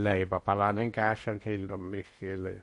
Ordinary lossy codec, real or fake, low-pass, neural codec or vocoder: MP3, 48 kbps; fake; 10.8 kHz; codec, 16 kHz in and 24 kHz out, 0.8 kbps, FocalCodec, streaming, 65536 codes